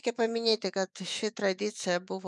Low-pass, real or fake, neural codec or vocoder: 10.8 kHz; fake; autoencoder, 48 kHz, 128 numbers a frame, DAC-VAE, trained on Japanese speech